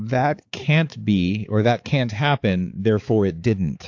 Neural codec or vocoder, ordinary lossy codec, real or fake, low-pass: codec, 16 kHz, 4 kbps, X-Codec, HuBERT features, trained on balanced general audio; AAC, 48 kbps; fake; 7.2 kHz